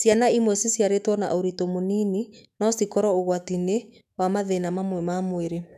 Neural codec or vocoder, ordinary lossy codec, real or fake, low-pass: autoencoder, 48 kHz, 128 numbers a frame, DAC-VAE, trained on Japanese speech; none; fake; 14.4 kHz